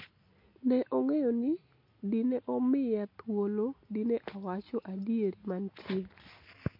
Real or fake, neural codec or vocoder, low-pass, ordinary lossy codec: real; none; 5.4 kHz; MP3, 32 kbps